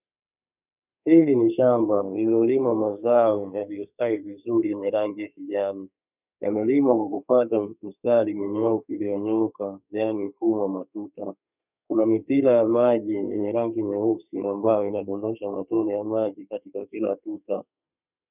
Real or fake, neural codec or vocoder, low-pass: fake; codec, 32 kHz, 1.9 kbps, SNAC; 3.6 kHz